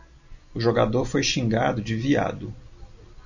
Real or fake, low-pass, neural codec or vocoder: real; 7.2 kHz; none